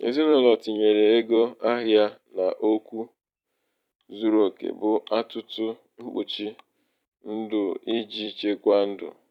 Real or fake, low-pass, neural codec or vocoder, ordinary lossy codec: fake; 19.8 kHz; vocoder, 44.1 kHz, 128 mel bands every 256 samples, BigVGAN v2; none